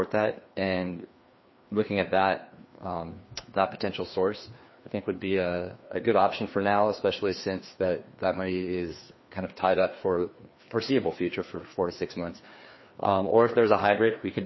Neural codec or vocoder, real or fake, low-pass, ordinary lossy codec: codec, 16 kHz, 2 kbps, FreqCodec, larger model; fake; 7.2 kHz; MP3, 24 kbps